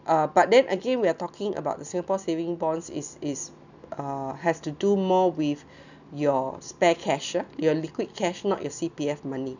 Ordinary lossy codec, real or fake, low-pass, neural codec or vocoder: none; real; 7.2 kHz; none